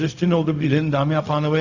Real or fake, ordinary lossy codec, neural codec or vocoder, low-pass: fake; Opus, 64 kbps; codec, 16 kHz, 0.4 kbps, LongCat-Audio-Codec; 7.2 kHz